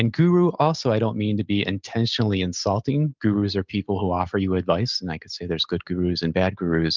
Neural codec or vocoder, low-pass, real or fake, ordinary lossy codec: none; 7.2 kHz; real; Opus, 24 kbps